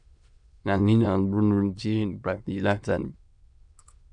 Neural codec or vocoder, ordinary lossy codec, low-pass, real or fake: autoencoder, 22.05 kHz, a latent of 192 numbers a frame, VITS, trained on many speakers; MP3, 96 kbps; 9.9 kHz; fake